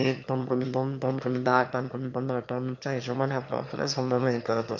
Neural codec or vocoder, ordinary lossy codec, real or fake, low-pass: autoencoder, 22.05 kHz, a latent of 192 numbers a frame, VITS, trained on one speaker; MP3, 48 kbps; fake; 7.2 kHz